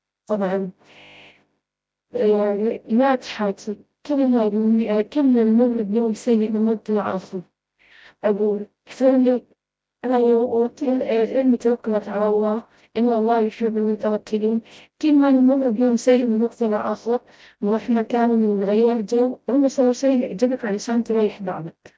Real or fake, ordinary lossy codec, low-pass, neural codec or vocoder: fake; none; none; codec, 16 kHz, 0.5 kbps, FreqCodec, smaller model